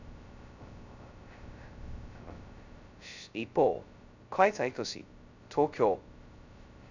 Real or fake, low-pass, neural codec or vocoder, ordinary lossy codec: fake; 7.2 kHz; codec, 16 kHz, 0.2 kbps, FocalCodec; none